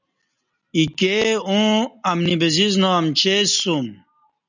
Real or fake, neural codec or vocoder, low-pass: real; none; 7.2 kHz